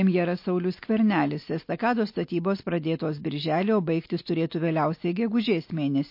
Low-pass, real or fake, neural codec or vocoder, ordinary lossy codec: 5.4 kHz; real; none; MP3, 32 kbps